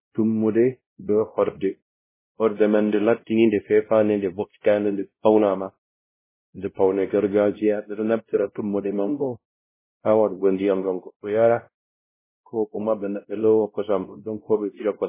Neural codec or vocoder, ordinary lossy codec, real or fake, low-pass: codec, 16 kHz, 0.5 kbps, X-Codec, WavLM features, trained on Multilingual LibriSpeech; MP3, 16 kbps; fake; 3.6 kHz